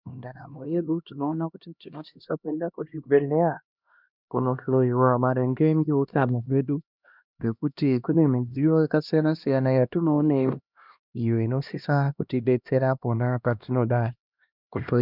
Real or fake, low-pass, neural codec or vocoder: fake; 5.4 kHz; codec, 16 kHz, 1 kbps, X-Codec, HuBERT features, trained on LibriSpeech